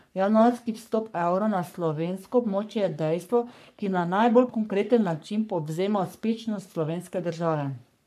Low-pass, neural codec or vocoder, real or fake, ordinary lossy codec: 14.4 kHz; codec, 44.1 kHz, 3.4 kbps, Pupu-Codec; fake; MP3, 96 kbps